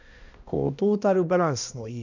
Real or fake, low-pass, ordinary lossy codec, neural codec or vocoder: fake; 7.2 kHz; none; codec, 16 kHz, 1 kbps, X-Codec, HuBERT features, trained on balanced general audio